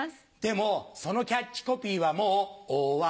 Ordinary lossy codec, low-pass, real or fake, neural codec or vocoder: none; none; real; none